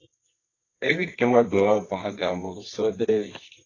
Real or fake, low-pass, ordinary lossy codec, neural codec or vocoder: fake; 7.2 kHz; AAC, 32 kbps; codec, 24 kHz, 0.9 kbps, WavTokenizer, medium music audio release